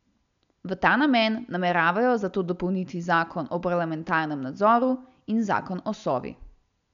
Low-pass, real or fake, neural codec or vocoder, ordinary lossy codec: 7.2 kHz; real; none; none